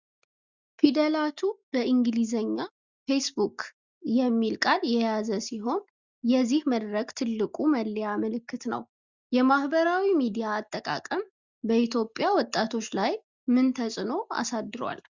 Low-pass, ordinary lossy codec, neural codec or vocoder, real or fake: 7.2 kHz; Opus, 64 kbps; none; real